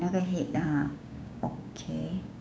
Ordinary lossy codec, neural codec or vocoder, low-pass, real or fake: none; codec, 16 kHz, 6 kbps, DAC; none; fake